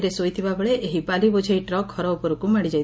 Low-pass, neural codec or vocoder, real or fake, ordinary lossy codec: none; none; real; none